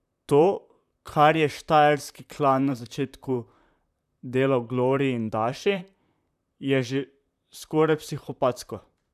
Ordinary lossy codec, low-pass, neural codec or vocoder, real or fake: none; 14.4 kHz; vocoder, 44.1 kHz, 128 mel bands, Pupu-Vocoder; fake